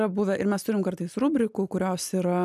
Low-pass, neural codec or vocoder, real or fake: 14.4 kHz; none; real